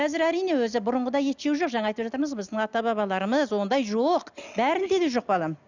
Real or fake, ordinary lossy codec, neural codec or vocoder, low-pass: real; none; none; 7.2 kHz